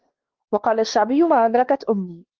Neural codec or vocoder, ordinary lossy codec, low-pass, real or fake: codec, 16 kHz, 2 kbps, X-Codec, WavLM features, trained on Multilingual LibriSpeech; Opus, 16 kbps; 7.2 kHz; fake